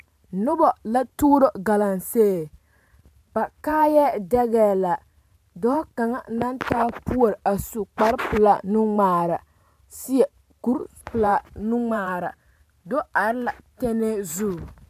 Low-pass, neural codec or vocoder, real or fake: 14.4 kHz; vocoder, 44.1 kHz, 128 mel bands every 512 samples, BigVGAN v2; fake